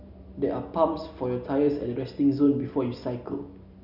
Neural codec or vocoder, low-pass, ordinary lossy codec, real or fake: none; 5.4 kHz; none; real